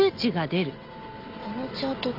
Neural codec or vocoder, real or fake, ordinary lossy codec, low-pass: none; real; none; 5.4 kHz